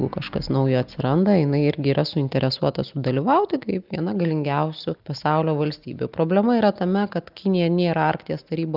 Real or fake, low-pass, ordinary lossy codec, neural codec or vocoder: real; 5.4 kHz; Opus, 24 kbps; none